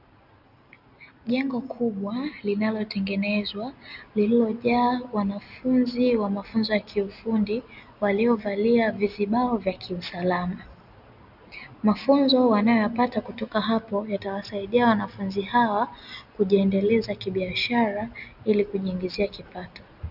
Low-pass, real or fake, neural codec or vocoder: 5.4 kHz; real; none